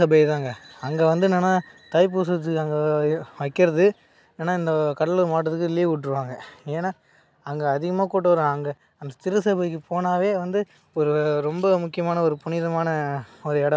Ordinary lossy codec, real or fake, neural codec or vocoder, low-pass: none; real; none; none